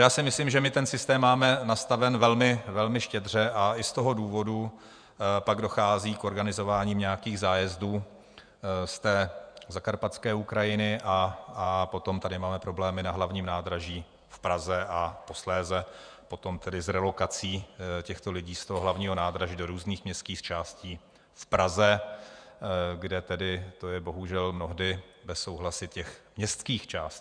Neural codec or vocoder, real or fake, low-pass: vocoder, 48 kHz, 128 mel bands, Vocos; fake; 9.9 kHz